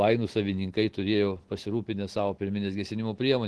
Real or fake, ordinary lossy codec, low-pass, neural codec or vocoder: real; Opus, 16 kbps; 10.8 kHz; none